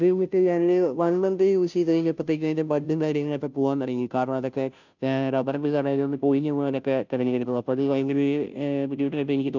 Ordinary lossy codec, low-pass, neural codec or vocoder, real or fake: none; 7.2 kHz; codec, 16 kHz, 0.5 kbps, FunCodec, trained on Chinese and English, 25 frames a second; fake